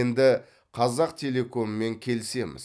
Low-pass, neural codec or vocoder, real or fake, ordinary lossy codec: none; none; real; none